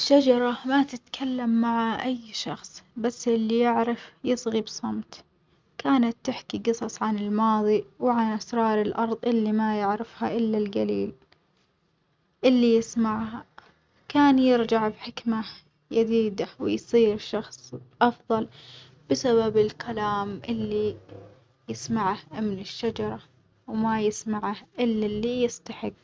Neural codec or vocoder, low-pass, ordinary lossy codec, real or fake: none; none; none; real